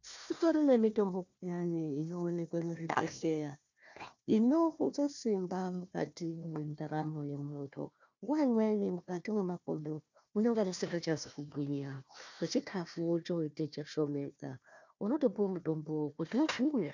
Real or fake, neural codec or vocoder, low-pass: fake; codec, 16 kHz, 1 kbps, FunCodec, trained on Chinese and English, 50 frames a second; 7.2 kHz